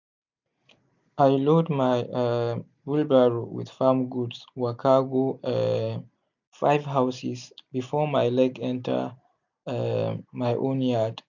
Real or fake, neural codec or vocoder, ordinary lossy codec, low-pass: real; none; none; 7.2 kHz